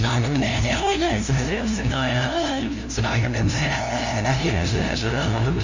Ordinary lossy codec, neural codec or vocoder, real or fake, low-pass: Opus, 64 kbps; codec, 16 kHz, 0.5 kbps, FunCodec, trained on LibriTTS, 25 frames a second; fake; 7.2 kHz